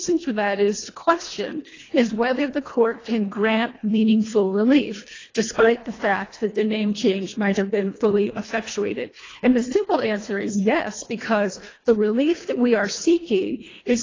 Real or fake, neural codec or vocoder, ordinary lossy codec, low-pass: fake; codec, 24 kHz, 1.5 kbps, HILCodec; AAC, 32 kbps; 7.2 kHz